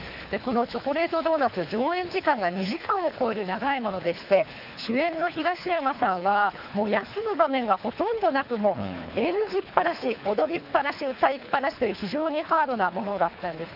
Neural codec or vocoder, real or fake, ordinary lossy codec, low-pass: codec, 24 kHz, 3 kbps, HILCodec; fake; none; 5.4 kHz